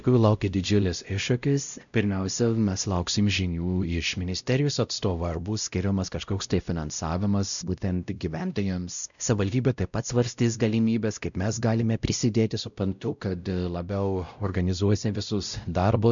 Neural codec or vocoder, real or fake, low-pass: codec, 16 kHz, 0.5 kbps, X-Codec, WavLM features, trained on Multilingual LibriSpeech; fake; 7.2 kHz